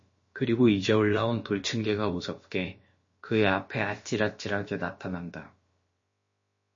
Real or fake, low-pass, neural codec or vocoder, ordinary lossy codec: fake; 7.2 kHz; codec, 16 kHz, about 1 kbps, DyCAST, with the encoder's durations; MP3, 32 kbps